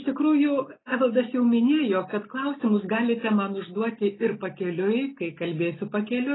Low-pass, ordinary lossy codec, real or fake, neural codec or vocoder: 7.2 kHz; AAC, 16 kbps; real; none